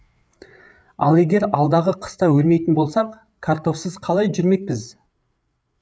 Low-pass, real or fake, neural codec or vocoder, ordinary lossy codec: none; fake; codec, 16 kHz, 8 kbps, FreqCodec, larger model; none